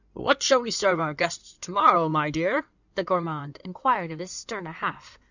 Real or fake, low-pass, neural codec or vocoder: fake; 7.2 kHz; codec, 16 kHz in and 24 kHz out, 2.2 kbps, FireRedTTS-2 codec